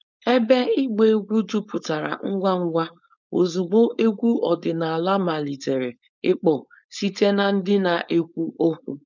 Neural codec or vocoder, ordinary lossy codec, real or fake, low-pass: codec, 16 kHz, 4.8 kbps, FACodec; none; fake; 7.2 kHz